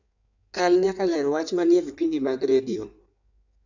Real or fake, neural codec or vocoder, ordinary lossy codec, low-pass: fake; codec, 16 kHz in and 24 kHz out, 1.1 kbps, FireRedTTS-2 codec; none; 7.2 kHz